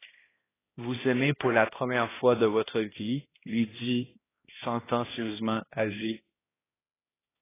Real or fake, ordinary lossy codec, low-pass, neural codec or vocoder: fake; AAC, 16 kbps; 3.6 kHz; codec, 16 kHz, 1 kbps, X-Codec, HuBERT features, trained on balanced general audio